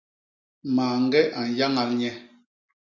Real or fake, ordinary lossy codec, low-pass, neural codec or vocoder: real; MP3, 48 kbps; 7.2 kHz; none